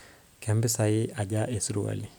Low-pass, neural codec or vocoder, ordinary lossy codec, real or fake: none; none; none; real